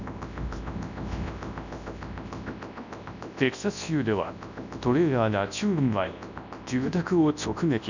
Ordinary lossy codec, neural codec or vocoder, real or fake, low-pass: none; codec, 24 kHz, 0.9 kbps, WavTokenizer, large speech release; fake; 7.2 kHz